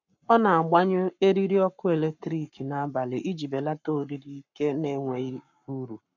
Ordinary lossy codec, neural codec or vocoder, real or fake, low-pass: none; codec, 44.1 kHz, 7.8 kbps, Pupu-Codec; fake; 7.2 kHz